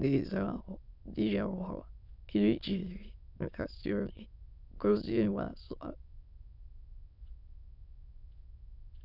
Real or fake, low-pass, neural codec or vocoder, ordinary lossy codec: fake; 5.4 kHz; autoencoder, 22.05 kHz, a latent of 192 numbers a frame, VITS, trained on many speakers; none